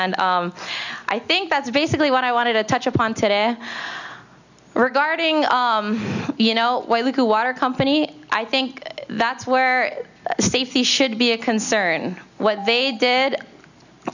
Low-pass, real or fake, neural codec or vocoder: 7.2 kHz; real; none